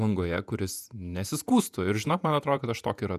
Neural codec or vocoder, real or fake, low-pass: vocoder, 48 kHz, 128 mel bands, Vocos; fake; 14.4 kHz